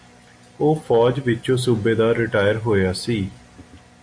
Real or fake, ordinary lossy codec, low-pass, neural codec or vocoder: real; AAC, 64 kbps; 9.9 kHz; none